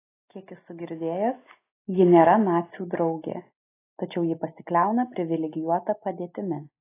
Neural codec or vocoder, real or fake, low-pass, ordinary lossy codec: none; real; 3.6 kHz; AAC, 24 kbps